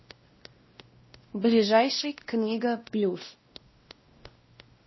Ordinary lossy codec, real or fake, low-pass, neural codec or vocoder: MP3, 24 kbps; fake; 7.2 kHz; codec, 16 kHz, 1 kbps, X-Codec, WavLM features, trained on Multilingual LibriSpeech